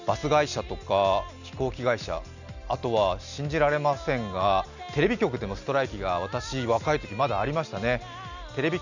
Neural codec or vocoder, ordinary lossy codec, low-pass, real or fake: none; none; 7.2 kHz; real